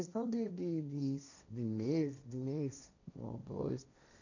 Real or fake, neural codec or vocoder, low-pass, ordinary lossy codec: fake; codec, 16 kHz, 1.1 kbps, Voila-Tokenizer; 7.2 kHz; none